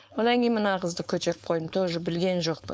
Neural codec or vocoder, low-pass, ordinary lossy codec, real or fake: codec, 16 kHz, 4.8 kbps, FACodec; none; none; fake